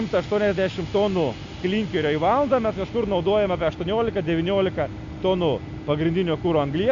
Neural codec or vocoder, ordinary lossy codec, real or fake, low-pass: none; MP3, 64 kbps; real; 7.2 kHz